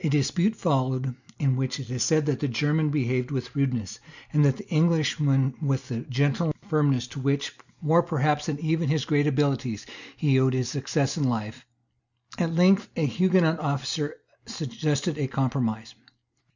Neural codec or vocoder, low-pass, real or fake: none; 7.2 kHz; real